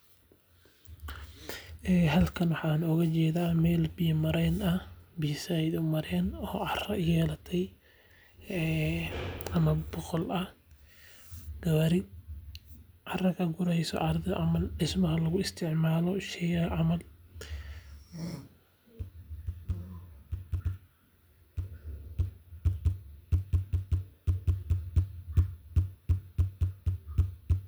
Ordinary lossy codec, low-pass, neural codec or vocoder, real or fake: none; none; none; real